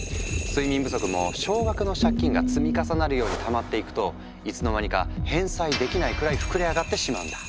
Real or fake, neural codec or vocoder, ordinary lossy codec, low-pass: real; none; none; none